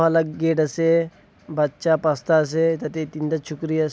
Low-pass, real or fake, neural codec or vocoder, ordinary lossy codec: none; real; none; none